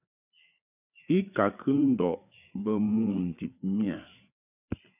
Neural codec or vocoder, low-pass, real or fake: codec, 16 kHz, 4 kbps, FreqCodec, larger model; 3.6 kHz; fake